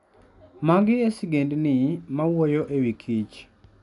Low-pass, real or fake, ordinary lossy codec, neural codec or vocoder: 10.8 kHz; real; none; none